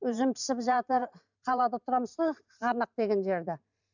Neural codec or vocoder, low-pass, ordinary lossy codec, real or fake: vocoder, 44.1 kHz, 128 mel bands every 512 samples, BigVGAN v2; 7.2 kHz; none; fake